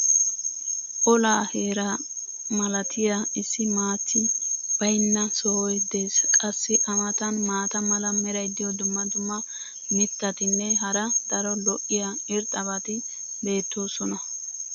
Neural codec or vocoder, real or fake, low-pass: none; real; 7.2 kHz